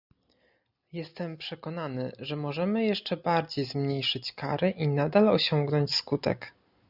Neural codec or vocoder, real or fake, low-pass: none; real; 5.4 kHz